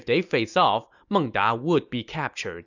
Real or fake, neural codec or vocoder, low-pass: real; none; 7.2 kHz